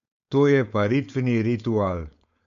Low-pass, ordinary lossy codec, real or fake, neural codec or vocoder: 7.2 kHz; AAC, 64 kbps; fake; codec, 16 kHz, 4.8 kbps, FACodec